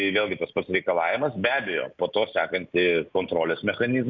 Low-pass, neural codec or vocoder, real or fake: 7.2 kHz; none; real